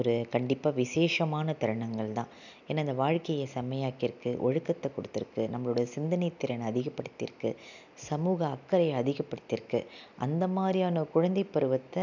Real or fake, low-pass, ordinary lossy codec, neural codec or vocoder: real; 7.2 kHz; none; none